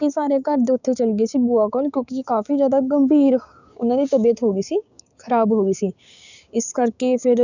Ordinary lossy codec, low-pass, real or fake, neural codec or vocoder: none; 7.2 kHz; fake; codec, 16 kHz, 6 kbps, DAC